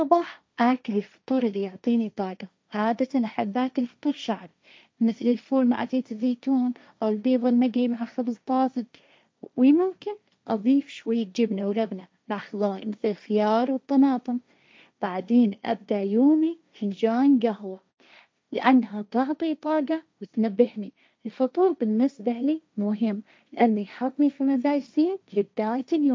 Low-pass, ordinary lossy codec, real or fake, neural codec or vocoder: none; none; fake; codec, 16 kHz, 1.1 kbps, Voila-Tokenizer